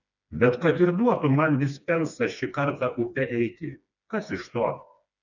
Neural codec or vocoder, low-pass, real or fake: codec, 16 kHz, 2 kbps, FreqCodec, smaller model; 7.2 kHz; fake